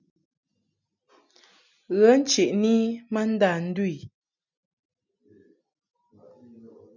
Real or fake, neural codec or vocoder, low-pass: real; none; 7.2 kHz